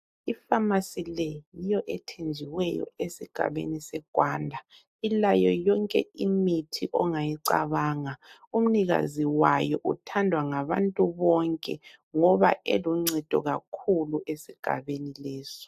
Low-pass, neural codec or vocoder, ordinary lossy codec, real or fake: 14.4 kHz; none; AAC, 96 kbps; real